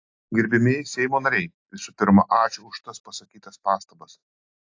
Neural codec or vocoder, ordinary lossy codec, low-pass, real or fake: none; AAC, 48 kbps; 7.2 kHz; real